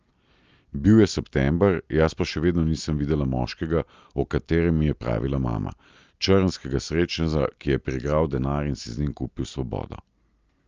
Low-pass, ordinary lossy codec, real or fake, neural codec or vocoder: 7.2 kHz; Opus, 24 kbps; real; none